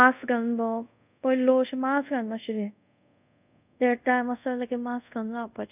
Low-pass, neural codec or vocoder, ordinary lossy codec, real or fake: 3.6 kHz; codec, 24 kHz, 0.5 kbps, DualCodec; none; fake